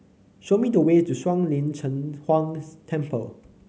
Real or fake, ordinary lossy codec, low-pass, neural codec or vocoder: real; none; none; none